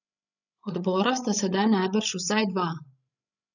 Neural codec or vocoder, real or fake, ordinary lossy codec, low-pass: codec, 16 kHz, 16 kbps, FreqCodec, larger model; fake; none; 7.2 kHz